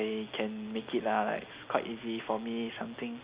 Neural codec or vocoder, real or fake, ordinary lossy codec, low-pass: none; real; Opus, 64 kbps; 3.6 kHz